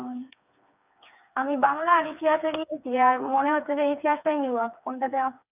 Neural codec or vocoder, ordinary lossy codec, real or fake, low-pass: codec, 16 kHz, 4 kbps, FreqCodec, smaller model; none; fake; 3.6 kHz